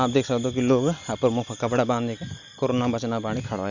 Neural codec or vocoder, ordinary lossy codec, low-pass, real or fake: none; none; 7.2 kHz; real